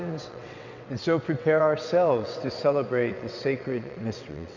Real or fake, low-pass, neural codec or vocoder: fake; 7.2 kHz; vocoder, 22.05 kHz, 80 mel bands, WaveNeXt